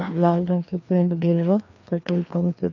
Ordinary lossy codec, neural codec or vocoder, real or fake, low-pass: none; codec, 16 kHz, 2 kbps, FreqCodec, larger model; fake; 7.2 kHz